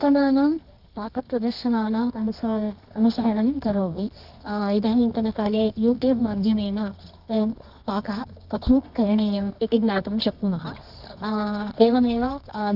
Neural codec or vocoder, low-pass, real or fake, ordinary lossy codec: codec, 24 kHz, 0.9 kbps, WavTokenizer, medium music audio release; 5.4 kHz; fake; none